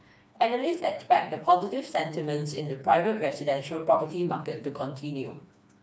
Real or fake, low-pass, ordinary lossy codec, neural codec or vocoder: fake; none; none; codec, 16 kHz, 2 kbps, FreqCodec, smaller model